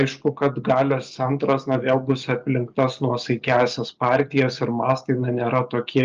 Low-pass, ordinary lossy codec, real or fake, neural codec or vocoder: 7.2 kHz; Opus, 32 kbps; real; none